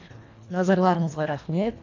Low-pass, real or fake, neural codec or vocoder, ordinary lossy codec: 7.2 kHz; fake; codec, 24 kHz, 1.5 kbps, HILCodec; AAC, 48 kbps